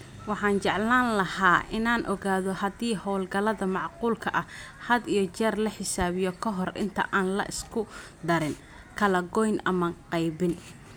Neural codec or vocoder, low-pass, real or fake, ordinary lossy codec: none; none; real; none